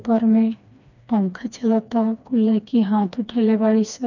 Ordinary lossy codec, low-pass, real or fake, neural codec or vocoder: none; 7.2 kHz; fake; codec, 16 kHz, 2 kbps, FreqCodec, smaller model